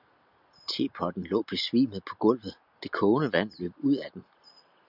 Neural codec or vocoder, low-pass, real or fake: none; 5.4 kHz; real